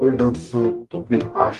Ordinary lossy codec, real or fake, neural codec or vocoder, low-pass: Opus, 32 kbps; fake; codec, 44.1 kHz, 0.9 kbps, DAC; 14.4 kHz